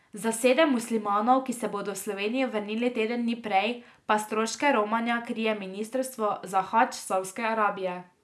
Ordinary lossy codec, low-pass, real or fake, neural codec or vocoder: none; none; real; none